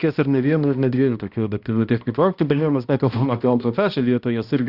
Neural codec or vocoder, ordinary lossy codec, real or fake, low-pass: codec, 16 kHz, 1 kbps, X-Codec, HuBERT features, trained on balanced general audio; Opus, 64 kbps; fake; 5.4 kHz